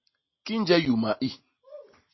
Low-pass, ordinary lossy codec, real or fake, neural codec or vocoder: 7.2 kHz; MP3, 24 kbps; real; none